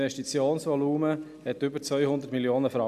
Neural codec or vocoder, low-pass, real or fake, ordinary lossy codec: none; 14.4 kHz; real; none